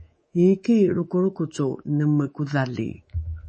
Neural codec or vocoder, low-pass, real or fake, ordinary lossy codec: codec, 24 kHz, 3.1 kbps, DualCodec; 10.8 kHz; fake; MP3, 32 kbps